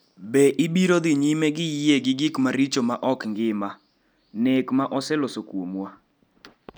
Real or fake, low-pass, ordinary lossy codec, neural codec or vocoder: real; none; none; none